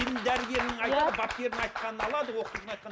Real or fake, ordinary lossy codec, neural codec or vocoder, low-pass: real; none; none; none